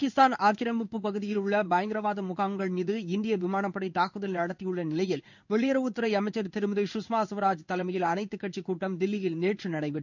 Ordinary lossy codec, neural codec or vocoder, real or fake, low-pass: none; codec, 16 kHz in and 24 kHz out, 1 kbps, XY-Tokenizer; fake; 7.2 kHz